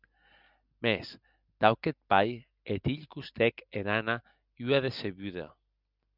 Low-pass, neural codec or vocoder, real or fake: 5.4 kHz; none; real